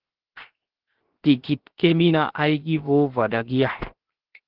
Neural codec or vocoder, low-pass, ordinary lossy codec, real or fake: codec, 16 kHz, 0.7 kbps, FocalCodec; 5.4 kHz; Opus, 16 kbps; fake